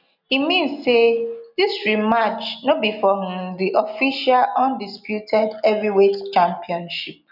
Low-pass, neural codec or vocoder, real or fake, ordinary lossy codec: 5.4 kHz; none; real; none